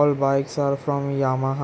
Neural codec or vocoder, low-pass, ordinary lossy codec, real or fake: none; none; none; real